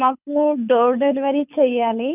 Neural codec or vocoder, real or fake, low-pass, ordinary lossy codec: codec, 24 kHz, 6 kbps, HILCodec; fake; 3.6 kHz; none